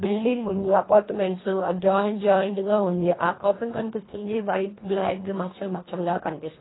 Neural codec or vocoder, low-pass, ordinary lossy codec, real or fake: codec, 24 kHz, 1.5 kbps, HILCodec; 7.2 kHz; AAC, 16 kbps; fake